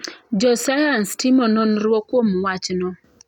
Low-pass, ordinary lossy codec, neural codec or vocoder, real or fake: 19.8 kHz; none; none; real